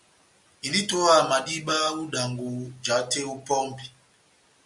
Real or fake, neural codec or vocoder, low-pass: real; none; 10.8 kHz